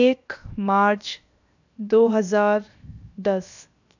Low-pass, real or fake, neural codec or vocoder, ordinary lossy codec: 7.2 kHz; fake; codec, 16 kHz, 0.7 kbps, FocalCodec; none